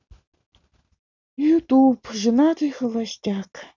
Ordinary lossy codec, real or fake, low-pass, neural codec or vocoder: none; real; 7.2 kHz; none